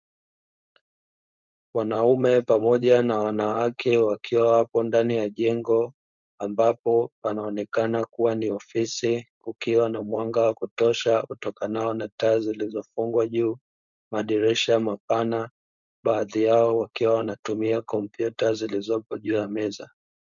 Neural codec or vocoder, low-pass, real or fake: codec, 16 kHz, 4.8 kbps, FACodec; 7.2 kHz; fake